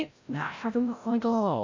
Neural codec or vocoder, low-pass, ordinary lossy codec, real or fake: codec, 16 kHz, 0.5 kbps, FreqCodec, larger model; 7.2 kHz; AAC, 48 kbps; fake